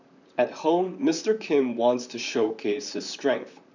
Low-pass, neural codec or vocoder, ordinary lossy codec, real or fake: 7.2 kHz; vocoder, 44.1 kHz, 128 mel bands, Pupu-Vocoder; none; fake